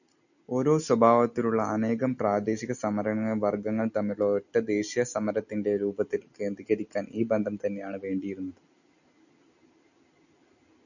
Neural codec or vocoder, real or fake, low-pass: none; real; 7.2 kHz